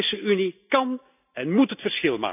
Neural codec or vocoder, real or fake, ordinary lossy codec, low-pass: none; real; none; 3.6 kHz